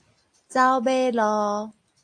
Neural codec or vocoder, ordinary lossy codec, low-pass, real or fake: none; AAC, 48 kbps; 9.9 kHz; real